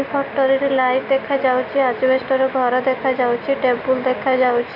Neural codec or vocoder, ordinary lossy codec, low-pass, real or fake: none; none; 5.4 kHz; real